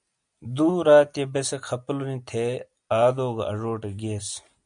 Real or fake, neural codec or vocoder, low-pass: real; none; 9.9 kHz